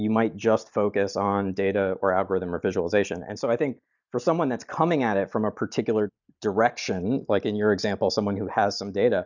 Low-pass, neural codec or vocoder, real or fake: 7.2 kHz; none; real